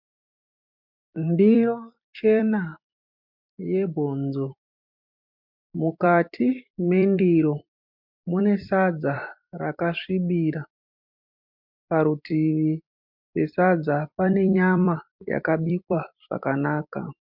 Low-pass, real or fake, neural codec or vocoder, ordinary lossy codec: 5.4 kHz; fake; vocoder, 44.1 kHz, 128 mel bands every 256 samples, BigVGAN v2; MP3, 48 kbps